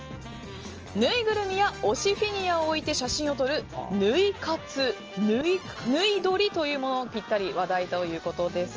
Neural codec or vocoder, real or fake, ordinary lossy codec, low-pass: none; real; Opus, 24 kbps; 7.2 kHz